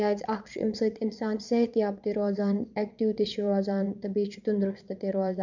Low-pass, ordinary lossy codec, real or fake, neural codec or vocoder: 7.2 kHz; none; fake; vocoder, 22.05 kHz, 80 mel bands, Vocos